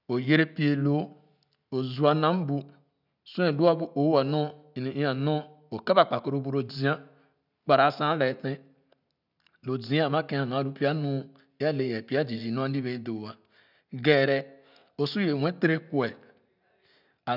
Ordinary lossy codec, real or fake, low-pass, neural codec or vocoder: none; real; 5.4 kHz; none